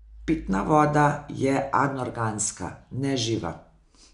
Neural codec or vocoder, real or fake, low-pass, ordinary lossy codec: none; real; 10.8 kHz; none